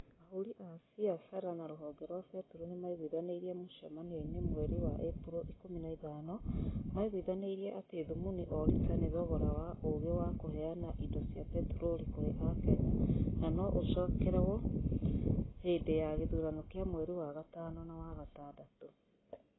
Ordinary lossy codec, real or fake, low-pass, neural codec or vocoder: AAC, 16 kbps; real; 7.2 kHz; none